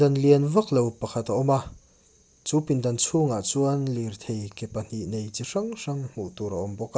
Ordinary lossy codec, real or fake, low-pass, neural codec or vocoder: none; real; none; none